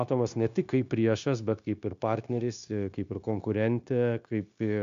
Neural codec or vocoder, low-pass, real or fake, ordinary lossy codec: codec, 16 kHz, 0.9 kbps, LongCat-Audio-Codec; 7.2 kHz; fake; MP3, 64 kbps